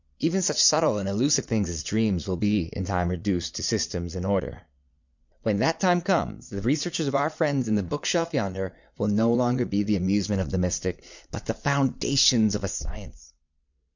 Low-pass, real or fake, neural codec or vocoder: 7.2 kHz; fake; vocoder, 22.05 kHz, 80 mel bands, Vocos